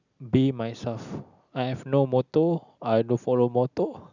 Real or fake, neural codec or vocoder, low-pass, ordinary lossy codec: real; none; 7.2 kHz; none